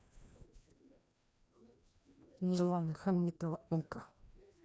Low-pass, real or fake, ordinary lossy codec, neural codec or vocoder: none; fake; none; codec, 16 kHz, 1 kbps, FreqCodec, larger model